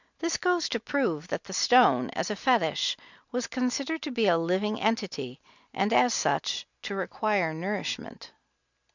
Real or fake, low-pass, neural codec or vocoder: real; 7.2 kHz; none